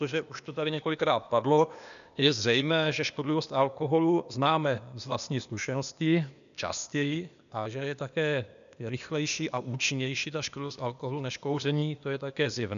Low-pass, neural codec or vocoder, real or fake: 7.2 kHz; codec, 16 kHz, 0.8 kbps, ZipCodec; fake